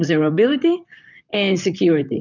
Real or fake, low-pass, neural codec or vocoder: fake; 7.2 kHz; vocoder, 44.1 kHz, 128 mel bands, Pupu-Vocoder